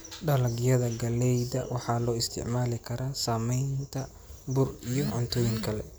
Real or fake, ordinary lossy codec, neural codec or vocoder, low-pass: real; none; none; none